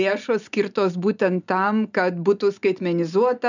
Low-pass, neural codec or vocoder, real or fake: 7.2 kHz; none; real